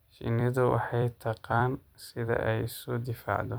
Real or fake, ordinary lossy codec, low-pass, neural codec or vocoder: fake; none; none; vocoder, 44.1 kHz, 128 mel bands every 256 samples, BigVGAN v2